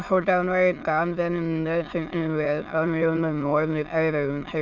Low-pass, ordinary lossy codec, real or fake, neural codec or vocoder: 7.2 kHz; none; fake; autoencoder, 22.05 kHz, a latent of 192 numbers a frame, VITS, trained on many speakers